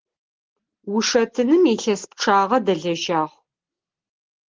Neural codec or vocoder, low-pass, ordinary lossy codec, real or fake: none; 7.2 kHz; Opus, 16 kbps; real